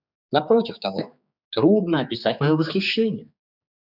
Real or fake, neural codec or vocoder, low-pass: fake; codec, 16 kHz, 4 kbps, X-Codec, HuBERT features, trained on general audio; 5.4 kHz